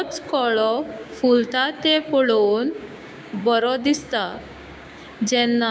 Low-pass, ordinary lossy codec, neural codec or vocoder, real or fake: none; none; codec, 16 kHz, 6 kbps, DAC; fake